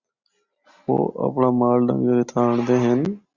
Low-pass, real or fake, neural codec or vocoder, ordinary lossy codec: 7.2 kHz; real; none; Opus, 64 kbps